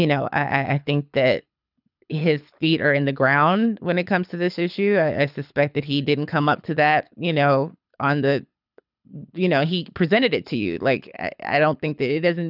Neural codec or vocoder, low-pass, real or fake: codec, 24 kHz, 6 kbps, HILCodec; 5.4 kHz; fake